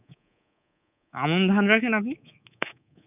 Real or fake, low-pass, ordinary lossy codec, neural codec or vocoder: fake; 3.6 kHz; none; codec, 24 kHz, 3.1 kbps, DualCodec